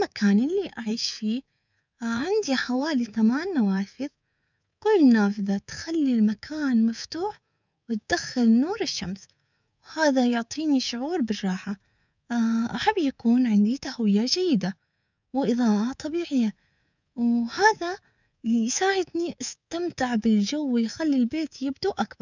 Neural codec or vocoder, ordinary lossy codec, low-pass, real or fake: codec, 24 kHz, 3.1 kbps, DualCodec; none; 7.2 kHz; fake